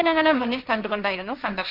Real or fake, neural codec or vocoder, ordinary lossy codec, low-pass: fake; codec, 16 kHz, 1.1 kbps, Voila-Tokenizer; none; 5.4 kHz